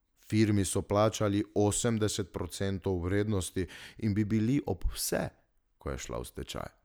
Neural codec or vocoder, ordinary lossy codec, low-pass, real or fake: none; none; none; real